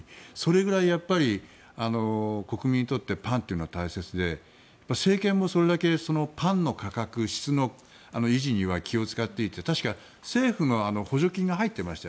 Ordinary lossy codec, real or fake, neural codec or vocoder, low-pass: none; real; none; none